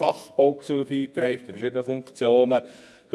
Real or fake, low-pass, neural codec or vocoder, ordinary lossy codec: fake; none; codec, 24 kHz, 0.9 kbps, WavTokenizer, medium music audio release; none